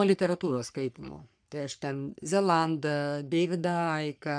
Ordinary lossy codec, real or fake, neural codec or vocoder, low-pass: MP3, 96 kbps; fake; codec, 44.1 kHz, 3.4 kbps, Pupu-Codec; 9.9 kHz